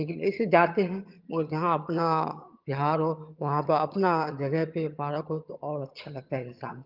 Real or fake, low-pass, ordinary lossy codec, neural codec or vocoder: fake; 5.4 kHz; Opus, 24 kbps; vocoder, 22.05 kHz, 80 mel bands, HiFi-GAN